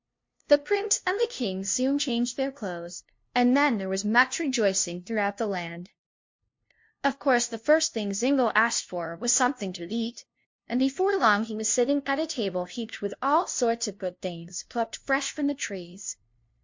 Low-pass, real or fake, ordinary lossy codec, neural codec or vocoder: 7.2 kHz; fake; MP3, 48 kbps; codec, 16 kHz, 0.5 kbps, FunCodec, trained on LibriTTS, 25 frames a second